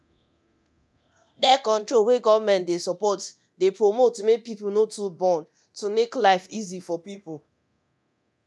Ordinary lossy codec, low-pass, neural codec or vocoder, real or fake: none; none; codec, 24 kHz, 0.9 kbps, DualCodec; fake